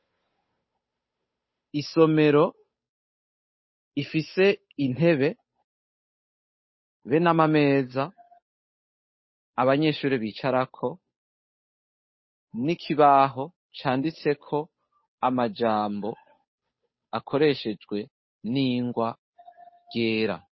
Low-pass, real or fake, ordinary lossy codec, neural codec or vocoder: 7.2 kHz; fake; MP3, 24 kbps; codec, 16 kHz, 8 kbps, FunCodec, trained on Chinese and English, 25 frames a second